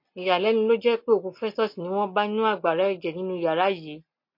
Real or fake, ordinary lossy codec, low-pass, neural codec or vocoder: real; MP3, 32 kbps; 5.4 kHz; none